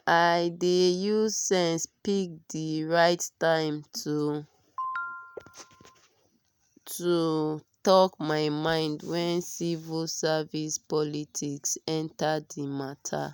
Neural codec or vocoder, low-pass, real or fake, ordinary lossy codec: none; none; real; none